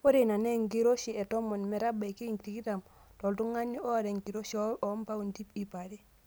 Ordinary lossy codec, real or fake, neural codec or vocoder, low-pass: none; real; none; none